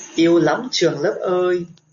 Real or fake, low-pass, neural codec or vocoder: real; 7.2 kHz; none